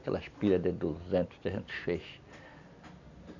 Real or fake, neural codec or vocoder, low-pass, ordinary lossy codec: real; none; 7.2 kHz; none